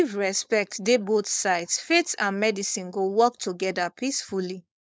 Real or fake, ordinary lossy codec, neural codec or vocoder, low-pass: fake; none; codec, 16 kHz, 4.8 kbps, FACodec; none